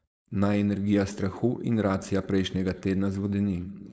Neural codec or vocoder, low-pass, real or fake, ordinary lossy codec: codec, 16 kHz, 4.8 kbps, FACodec; none; fake; none